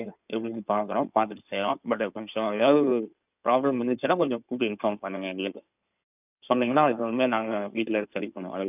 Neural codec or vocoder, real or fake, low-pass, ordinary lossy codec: codec, 16 kHz in and 24 kHz out, 2.2 kbps, FireRedTTS-2 codec; fake; 3.6 kHz; none